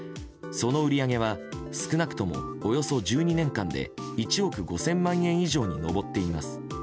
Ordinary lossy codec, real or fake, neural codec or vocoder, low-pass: none; real; none; none